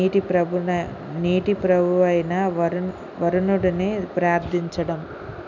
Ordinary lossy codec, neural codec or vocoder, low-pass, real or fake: none; none; 7.2 kHz; real